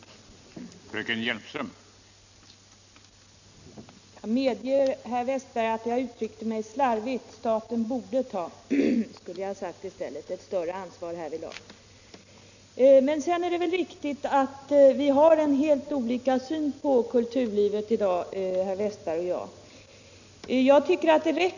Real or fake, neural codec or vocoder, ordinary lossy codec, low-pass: real; none; none; 7.2 kHz